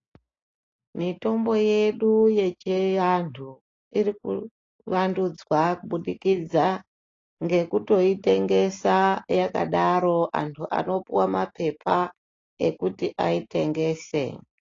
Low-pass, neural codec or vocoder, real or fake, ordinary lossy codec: 7.2 kHz; none; real; AAC, 32 kbps